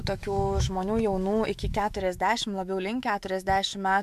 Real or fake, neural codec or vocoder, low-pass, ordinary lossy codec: fake; autoencoder, 48 kHz, 128 numbers a frame, DAC-VAE, trained on Japanese speech; 14.4 kHz; MP3, 96 kbps